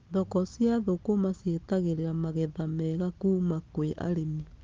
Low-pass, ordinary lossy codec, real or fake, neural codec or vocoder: 7.2 kHz; Opus, 32 kbps; real; none